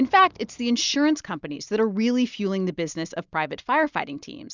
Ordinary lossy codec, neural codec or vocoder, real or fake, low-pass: Opus, 64 kbps; none; real; 7.2 kHz